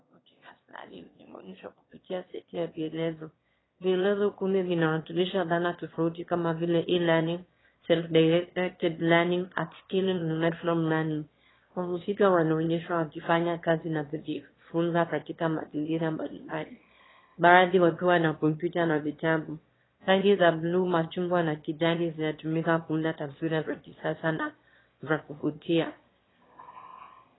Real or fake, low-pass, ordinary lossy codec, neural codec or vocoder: fake; 7.2 kHz; AAC, 16 kbps; autoencoder, 22.05 kHz, a latent of 192 numbers a frame, VITS, trained on one speaker